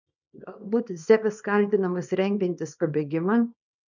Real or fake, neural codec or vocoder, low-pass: fake; codec, 24 kHz, 0.9 kbps, WavTokenizer, small release; 7.2 kHz